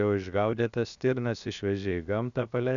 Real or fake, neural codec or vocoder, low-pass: fake; codec, 16 kHz, 0.7 kbps, FocalCodec; 7.2 kHz